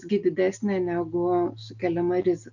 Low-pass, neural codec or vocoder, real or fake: 7.2 kHz; none; real